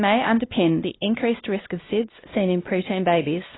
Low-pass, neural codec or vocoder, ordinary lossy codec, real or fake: 7.2 kHz; codec, 16 kHz, 1 kbps, X-Codec, WavLM features, trained on Multilingual LibriSpeech; AAC, 16 kbps; fake